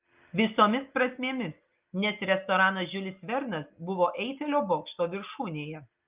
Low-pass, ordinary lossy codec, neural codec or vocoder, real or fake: 3.6 kHz; Opus, 24 kbps; none; real